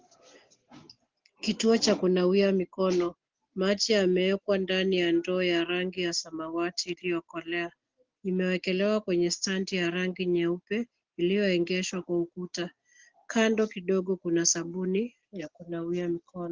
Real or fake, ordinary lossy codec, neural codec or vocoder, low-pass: real; Opus, 16 kbps; none; 7.2 kHz